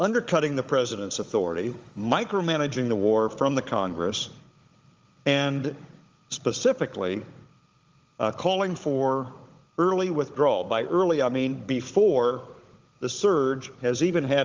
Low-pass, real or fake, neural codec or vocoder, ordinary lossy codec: 7.2 kHz; fake; codec, 16 kHz, 16 kbps, FunCodec, trained on Chinese and English, 50 frames a second; Opus, 32 kbps